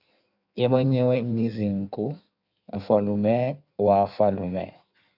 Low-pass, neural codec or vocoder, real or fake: 5.4 kHz; codec, 16 kHz in and 24 kHz out, 1.1 kbps, FireRedTTS-2 codec; fake